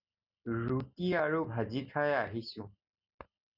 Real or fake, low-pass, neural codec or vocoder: real; 5.4 kHz; none